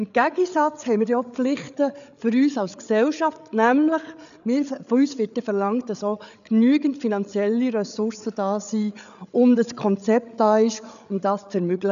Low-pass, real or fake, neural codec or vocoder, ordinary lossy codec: 7.2 kHz; fake; codec, 16 kHz, 8 kbps, FreqCodec, larger model; none